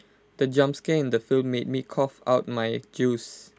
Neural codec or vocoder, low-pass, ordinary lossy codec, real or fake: none; none; none; real